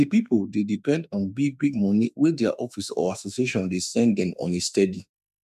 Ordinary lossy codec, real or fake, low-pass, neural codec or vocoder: none; fake; 14.4 kHz; autoencoder, 48 kHz, 32 numbers a frame, DAC-VAE, trained on Japanese speech